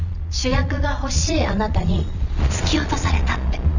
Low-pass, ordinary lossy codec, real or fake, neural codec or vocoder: 7.2 kHz; none; fake; vocoder, 22.05 kHz, 80 mel bands, Vocos